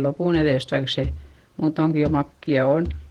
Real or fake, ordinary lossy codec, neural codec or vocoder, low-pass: fake; Opus, 16 kbps; vocoder, 48 kHz, 128 mel bands, Vocos; 19.8 kHz